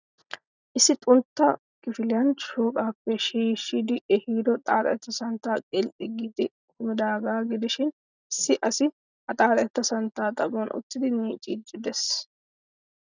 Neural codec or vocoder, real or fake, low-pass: none; real; 7.2 kHz